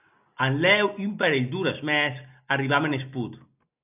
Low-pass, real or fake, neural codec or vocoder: 3.6 kHz; real; none